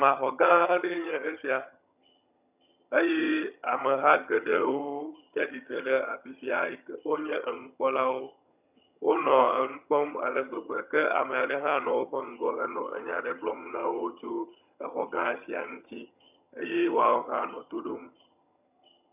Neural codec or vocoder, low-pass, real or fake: vocoder, 22.05 kHz, 80 mel bands, HiFi-GAN; 3.6 kHz; fake